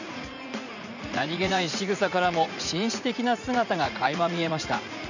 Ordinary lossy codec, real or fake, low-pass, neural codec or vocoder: none; fake; 7.2 kHz; vocoder, 44.1 kHz, 80 mel bands, Vocos